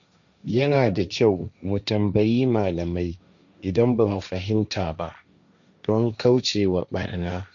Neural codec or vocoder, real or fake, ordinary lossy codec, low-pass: codec, 16 kHz, 1.1 kbps, Voila-Tokenizer; fake; none; 7.2 kHz